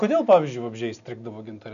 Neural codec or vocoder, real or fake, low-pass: none; real; 7.2 kHz